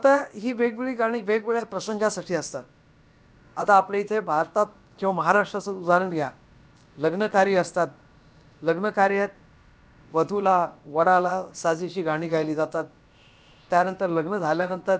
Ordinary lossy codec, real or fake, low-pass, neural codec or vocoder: none; fake; none; codec, 16 kHz, about 1 kbps, DyCAST, with the encoder's durations